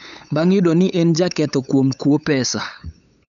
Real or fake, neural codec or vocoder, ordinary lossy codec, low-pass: fake; codec, 16 kHz, 8 kbps, FunCodec, trained on LibriTTS, 25 frames a second; none; 7.2 kHz